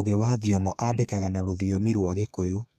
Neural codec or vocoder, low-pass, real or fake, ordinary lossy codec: codec, 32 kHz, 1.9 kbps, SNAC; 14.4 kHz; fake; none